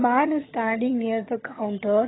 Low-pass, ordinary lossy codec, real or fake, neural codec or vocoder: 7.2 kHz; AAC, 16 kbps; fake; vocoder, 22.05 kHz, 80 mel bands, HiFi-GAN